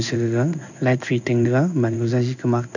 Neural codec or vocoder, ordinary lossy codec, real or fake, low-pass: codec, 16 kHz in and 24 kHz out, 1 kbps, XY-Tokenizer; none; fake; 7.2 kHz